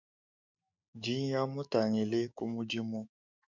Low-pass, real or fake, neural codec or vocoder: 7.2 kHz; fake; autoencoder, 48 kHz, 128 numbers a frame, DAC-VAE, trained on Japanese speech